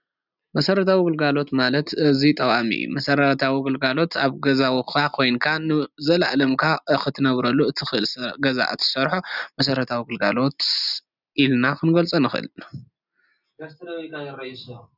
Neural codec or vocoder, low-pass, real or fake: none; 5.4 kHz; real